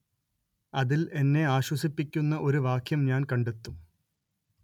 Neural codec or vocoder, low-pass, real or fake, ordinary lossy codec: none; 19.8 kHz; real; none